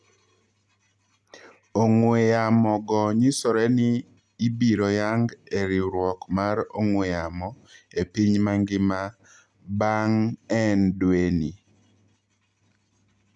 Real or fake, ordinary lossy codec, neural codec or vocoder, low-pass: real; none; none; none